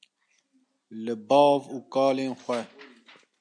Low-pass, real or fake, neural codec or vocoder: 9.9 kHz; real; none